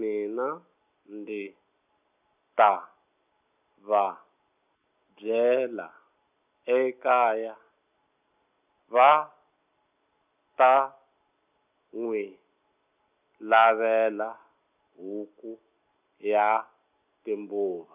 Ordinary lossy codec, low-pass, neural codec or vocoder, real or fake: none; 3.6 kHz; none; real